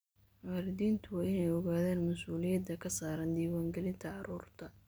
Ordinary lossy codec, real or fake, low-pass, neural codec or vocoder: none; real; none; none